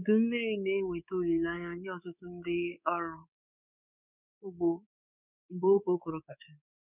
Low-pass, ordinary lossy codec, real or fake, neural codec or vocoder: 3.6 kHz; none; fake; codec, 24 kHz, 3.1 kbps, DualCodec